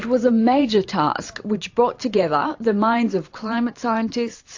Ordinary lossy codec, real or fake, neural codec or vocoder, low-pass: AAC, 48 kbps; fake; vocoder, 44.1 kHz, 128 mel bands every 512 samples, BigVGAN v2; 7.2 kHz